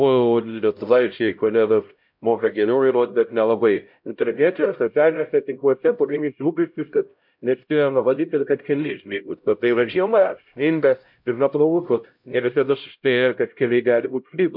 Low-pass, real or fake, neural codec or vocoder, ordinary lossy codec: 5.4 kHz; fake; codec, 16 kHz, 0.5 kbps, X-Codec, HuBERT features, trained on LibriSpeech; MP3, 48 kbps